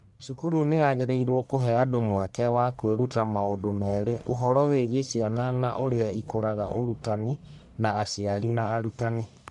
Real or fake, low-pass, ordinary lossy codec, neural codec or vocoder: fake; 10.8 kHz; MP3, 96 kbps; codec, 44.1 kHz, 1.7 kbps, Pupu-Codec